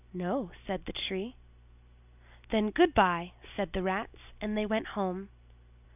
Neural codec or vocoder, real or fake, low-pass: none; real; 3.6 kHz